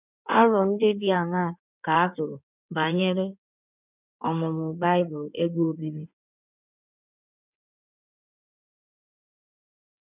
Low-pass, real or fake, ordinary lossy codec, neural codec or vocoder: 3.6 kHz; fake; none; codec, 16 kHz in and 24 kHz out, 2.2 kbps, FireRedTTS-2 codec